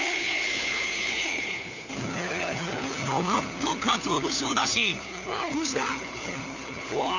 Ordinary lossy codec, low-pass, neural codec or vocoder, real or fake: none; 7.2 kHz; codec, 16 kHz, 4 kbps, FunCodec, trained on LibriTTS, 50 frames a second; fake